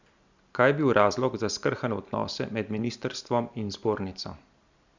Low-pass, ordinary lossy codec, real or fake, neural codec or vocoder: 7.2 kHz; Opus, 64 kbps; real; none